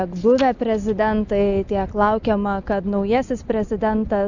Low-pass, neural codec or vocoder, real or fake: 7.2 kHz; none; real